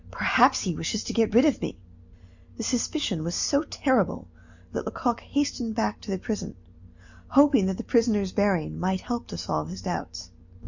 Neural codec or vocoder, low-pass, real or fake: none; 7.2 kHz; real